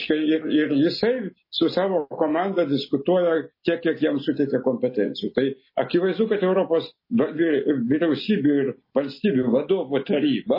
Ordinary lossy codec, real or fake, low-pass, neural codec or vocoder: MP3, 24 kbps; real; 5.4 kHz; none